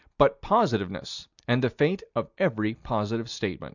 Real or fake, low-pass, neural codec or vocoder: real; 7.2 kHz; none